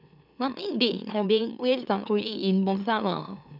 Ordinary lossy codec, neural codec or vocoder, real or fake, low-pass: none; autoencoder, 44.1 kHz, a latent of 192 numbers a frame, MeloTTS; fake; 5.4 kHz